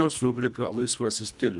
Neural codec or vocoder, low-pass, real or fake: codec, 24 kHz, 1.5 kbps, HILCodec; 10.8 kHz; fake